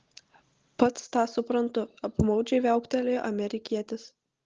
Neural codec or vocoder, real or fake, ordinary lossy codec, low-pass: none; real; Opus, 16 kbps; 7.2 kHz